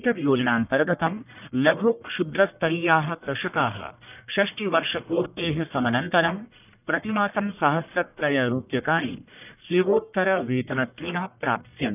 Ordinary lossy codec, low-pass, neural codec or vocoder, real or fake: none; 3.6 kHz; codec, 44.1 kHz, 1.7 kbps, Pupu-Codec; fake